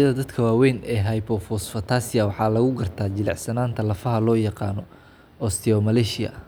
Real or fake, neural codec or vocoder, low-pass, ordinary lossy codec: real; none; none; none